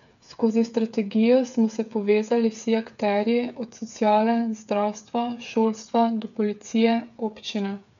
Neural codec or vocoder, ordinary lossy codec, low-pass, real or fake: codec, 16 kHz, 8 kbps, FreqCodec, smaller model; none; 7.2 kHz; fake